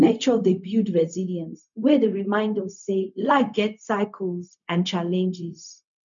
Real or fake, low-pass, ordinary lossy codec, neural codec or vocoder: fake; 7.2 kHz; none; codec, 16 kHz, 0.4 kbps, LongCat-Audio-Codec